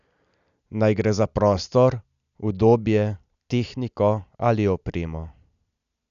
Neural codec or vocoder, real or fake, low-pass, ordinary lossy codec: none; real; 7.2 kHz; none